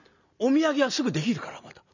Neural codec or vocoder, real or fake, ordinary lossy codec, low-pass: none; real; none; 7.2 kHz